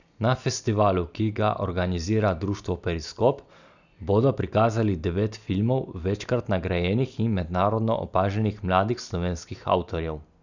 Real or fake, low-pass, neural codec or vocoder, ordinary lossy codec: real; 7.2 kHz; none; none